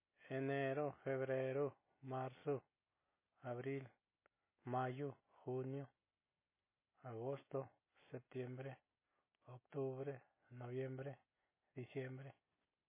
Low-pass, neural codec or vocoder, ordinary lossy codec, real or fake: 3.6 kHz; none; MP3, 16 kbps; real